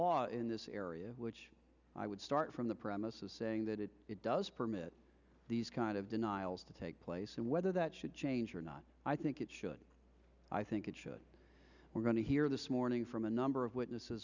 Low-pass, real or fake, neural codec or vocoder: 7.2 kHz; real; none